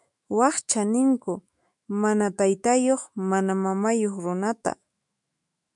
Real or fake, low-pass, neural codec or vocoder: fake; 10.8 kHz; autoencoder, 48 kHz, 128 numbers a frame, DAC-VAE, trained on Japanese speech